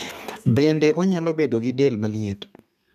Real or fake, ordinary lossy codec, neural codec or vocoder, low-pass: fake; none; codec, 32 kHz, 1.9 kbps, SNAC; 14.4 kHz